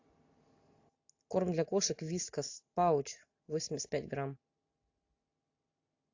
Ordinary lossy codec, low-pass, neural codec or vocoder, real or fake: AAC, 48 kbps; 7.2 kHz; none; real